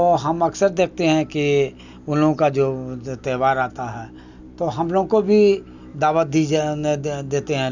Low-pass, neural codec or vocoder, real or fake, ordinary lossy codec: 7.2 kHz; none; real; none